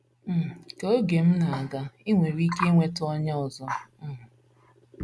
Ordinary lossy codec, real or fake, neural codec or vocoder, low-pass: none; real; none; none